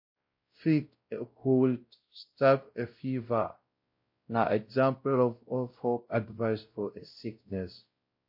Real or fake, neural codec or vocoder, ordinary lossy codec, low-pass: fake; codec, 16 kHz, 0.5 kbps, X-Codec, WavLM features, trained on Multilingual LibriSpeech; MP3, 32 kbps; 5.4 kHz